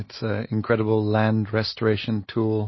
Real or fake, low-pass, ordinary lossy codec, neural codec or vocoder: real; 7.2 kHz; MP3, 24 kbps; none